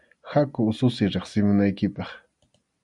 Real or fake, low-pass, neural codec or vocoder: real; 10.8 kHz; none